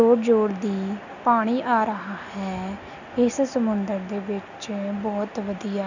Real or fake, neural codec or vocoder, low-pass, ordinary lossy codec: real; none; 7.2 kHz; none